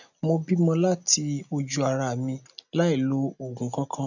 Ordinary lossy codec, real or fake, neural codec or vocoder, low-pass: none; real; none; 7.2 kHz